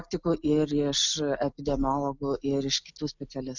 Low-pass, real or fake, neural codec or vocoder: 7.2 kHz; real; none